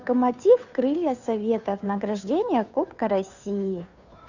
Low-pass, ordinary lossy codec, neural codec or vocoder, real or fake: 7.2 kHz; none; codec, 16 kHz, 2 kbps, FunCodec, trained on Chinese and English, 25 frames a second; fake